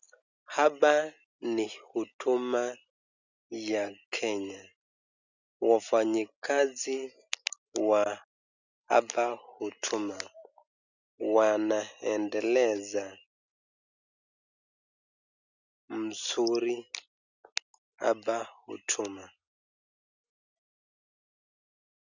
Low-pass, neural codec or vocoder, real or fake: 7.2 kHz; none; real